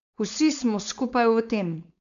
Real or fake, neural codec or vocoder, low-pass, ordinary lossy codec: fake; codec, 16 kHz, 4.8 kbps, FACodec; 7.2 kHz; none